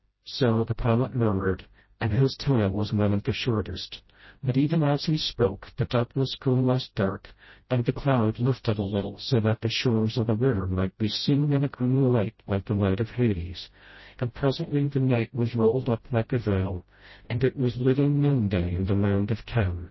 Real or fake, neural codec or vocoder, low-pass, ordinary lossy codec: fake; codec, 16 kHz, 0.5 kbps, FreqCodec, smaller model; 7.2 kHz; MP3, 24 kbps